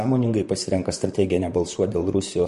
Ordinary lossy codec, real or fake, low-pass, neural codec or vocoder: MP3, 48 kbps; fake; 14.4 kHz; vocoder, 44.1 kHz, 128 mel bands, Pupu-Vocoder